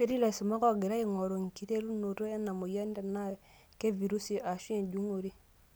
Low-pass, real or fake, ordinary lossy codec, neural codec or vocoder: none; real; none; none